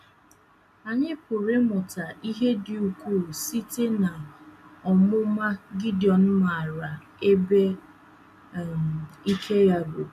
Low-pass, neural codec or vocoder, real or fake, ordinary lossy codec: 14.4 kHz; none; real; none